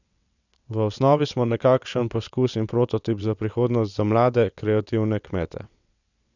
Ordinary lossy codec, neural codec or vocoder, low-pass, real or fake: none; vocoder, 22.05 kHz, 80 mel bands, WaveNeXt; 7.2 kHz; fake